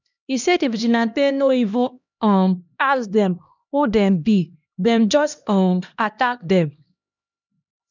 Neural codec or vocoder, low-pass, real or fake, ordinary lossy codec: codec, 16 kHz, 1 kbps, X-Codec, HuBERT features, trained on LibriSpeech; 7.2 kHz; fake; none